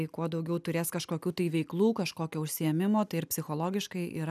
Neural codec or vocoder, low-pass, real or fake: none; 14.4 kHz; real